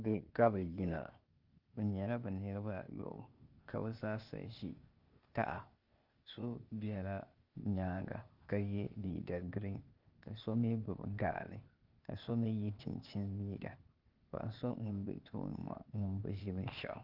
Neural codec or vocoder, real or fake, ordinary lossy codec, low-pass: codec, 16 kHz, 0.8 kbps, ZipCodec; fake; Opus, 32 kbps; 5.4 kHz